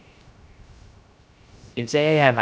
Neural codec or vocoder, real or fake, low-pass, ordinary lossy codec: codec, 16 kHz, 0.3 kbps, FocalCodec; fake; none; none